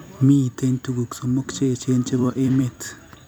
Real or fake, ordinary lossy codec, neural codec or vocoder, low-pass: fake; none; vocoder, 44.1 kHz, 128 mel bands every 256 samples, BigVGAN v2; none